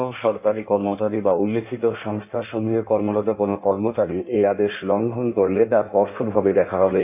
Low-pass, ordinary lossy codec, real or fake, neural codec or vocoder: 3.6 kHz; none; fake; codec, 16 kHz in and 24 kHz out, 1.1 kbps, FireRedTTS-2 codec